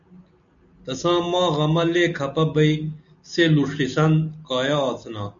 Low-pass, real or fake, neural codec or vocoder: 7.2 kHz; real; none